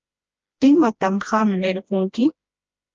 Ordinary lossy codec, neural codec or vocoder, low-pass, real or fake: Opus, 24 kbps; codec, 16 kHz, 1 kbps, FreqCodec, smaller model; 7.2 kHz; fake